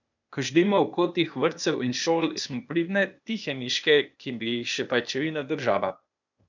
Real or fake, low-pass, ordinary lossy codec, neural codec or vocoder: fake; 7.2 kHz; none; codec, 16 kHz, 0.8 kbps, ZipCodec